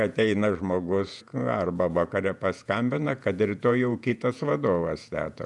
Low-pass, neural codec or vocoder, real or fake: 10.8 kHz; vocoder, 48 kHz, 128 mel bands, Vocos; fake